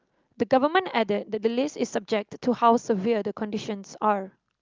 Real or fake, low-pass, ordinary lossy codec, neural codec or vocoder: real; 7.2 kHz; Opus, 32 kbps; none